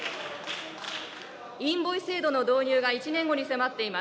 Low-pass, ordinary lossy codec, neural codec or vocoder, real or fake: none; none; none; real